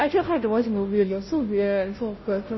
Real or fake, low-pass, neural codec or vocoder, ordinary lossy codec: fake; 7.2 kHz; codec, 16 kHz, 0.5 kbps, FunCodec, trained on Chinese and English, 25 frames a second; MP3, 24 kbps